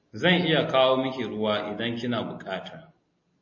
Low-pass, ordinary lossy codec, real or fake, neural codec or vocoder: 7.2 kHz; MP3, 32 kbps; real; none